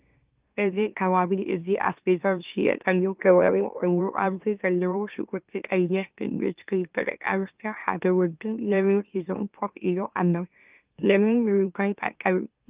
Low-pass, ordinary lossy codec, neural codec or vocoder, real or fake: 3.6 kHz; Opus, 24 kbps; autoencoder, 44.1 kHz, a latent of 192 numbers a frame, MeloTTS; fake